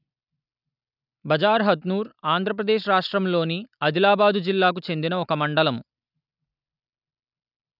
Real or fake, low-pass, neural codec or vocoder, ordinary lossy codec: real; 5.4 kHz; none; none